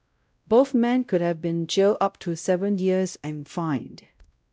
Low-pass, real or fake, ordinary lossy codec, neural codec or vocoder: none; fake; none; codec, 16 kHz, 0.5 kbps, X-Codec, WavLM features, trained on Multilingual LibriSpeech